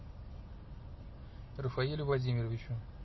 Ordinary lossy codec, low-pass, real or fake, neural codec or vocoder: MP3, 24 kbps; 7.2 kHz; real; none